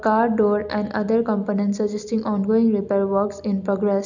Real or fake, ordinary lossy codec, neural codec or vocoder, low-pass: real; none; none; 7.2 kHz